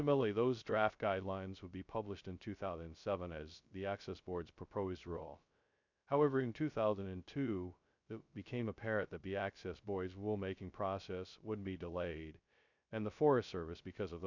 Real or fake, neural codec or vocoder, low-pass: fake; codec, 16 kHz, 0.2 kbps, FocalCodec; 7.2 kHz